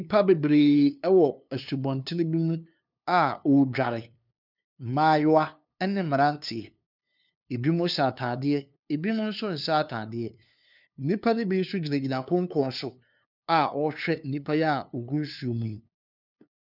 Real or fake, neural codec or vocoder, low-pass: fake; codec, 16 kHz, 2 kbps, FunCodec, trained on LibriTTS, 25 frames a second; 5.4 kHz